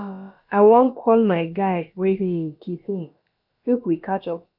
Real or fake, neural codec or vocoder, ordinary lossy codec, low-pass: fake; codec, 16 kHz, about 1 kbps, DyCAST, with the encoder's durations; none; 5.4 kHz